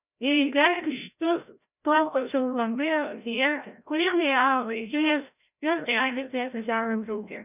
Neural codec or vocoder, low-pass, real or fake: codec, 16 kHz, 0.5 kbps, FreqCodec, larger model; 3.6 kHz; fake